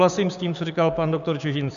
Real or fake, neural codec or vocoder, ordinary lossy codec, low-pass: fake; codec, 16 kHz, 6 kbps, DAC; AAC, 96 kbps; 7.2 kHz